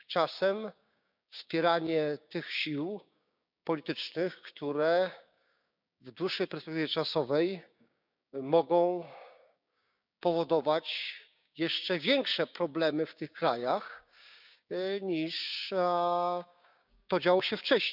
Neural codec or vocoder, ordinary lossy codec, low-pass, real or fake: codec, 16 kHz, 6 kbps, DAC; none; 5.4 kHz; fake